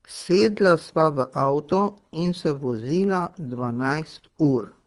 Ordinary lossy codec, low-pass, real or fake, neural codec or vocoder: Opus, 32 kbps; 10.8 kHz; fake; codec, 24 kHz, 3 kbps, HILCodec